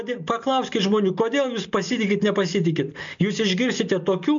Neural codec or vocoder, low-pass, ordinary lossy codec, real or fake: none; 7.2 kHz; MP3, 64 kbps; real